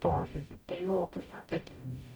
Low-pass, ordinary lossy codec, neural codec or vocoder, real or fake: none; none; codec, 44.1 kHz, 0.9 kbps, DAC; fake